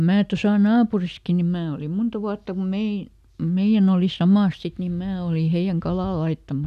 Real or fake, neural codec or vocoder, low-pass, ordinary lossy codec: real; none; 14.4 kHz; none